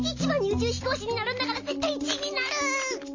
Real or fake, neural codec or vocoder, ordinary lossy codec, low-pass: real; none; MP3, 32 kbps; 7.2 kHz